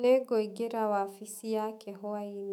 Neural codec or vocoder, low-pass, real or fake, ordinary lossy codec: autoencoder, 48 kHz, 128 numbers a frame, DAC-VAE, trained on Japanese speech; 19.8 kHz; fake; none